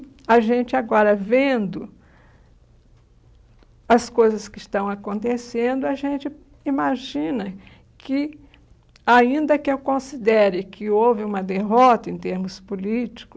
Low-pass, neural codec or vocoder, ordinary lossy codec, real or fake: none; none; none; real